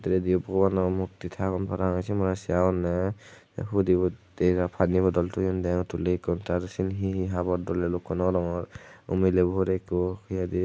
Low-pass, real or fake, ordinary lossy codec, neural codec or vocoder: none; real; none; none